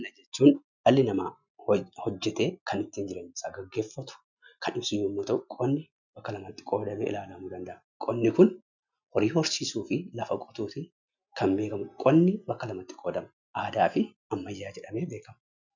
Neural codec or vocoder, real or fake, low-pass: none; real; 7.2 kHz